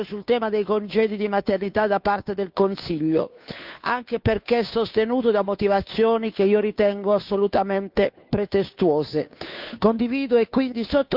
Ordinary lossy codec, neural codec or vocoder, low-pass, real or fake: none; codec, 16 kHz, 2 kbps, FunCodec, trained on Chinese and English, 25 frames a second; 5.4 kHz; fake